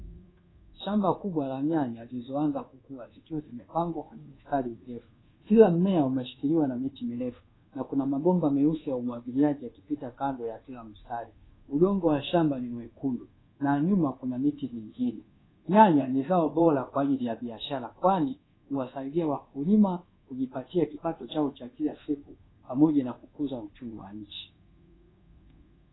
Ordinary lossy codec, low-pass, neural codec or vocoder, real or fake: AAC, 16 kbps; 7.2 kHz; codec, 24 kHz, 1.2 kbps, DualCodec; fake